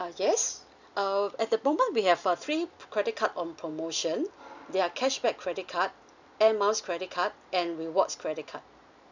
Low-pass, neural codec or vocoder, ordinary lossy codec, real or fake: 7.2 kHz; none; none; real